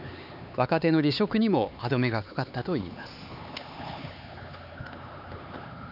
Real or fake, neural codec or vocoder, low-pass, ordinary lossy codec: fake; codec, 16 kHz, 4 kbps, X-Codec, HuBERT features, trained on LibriSpeech; 5.4 kHz; none